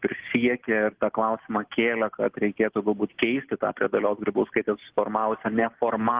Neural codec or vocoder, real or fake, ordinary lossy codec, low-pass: none; real; Opus, 16 kbps; 3.6 kHz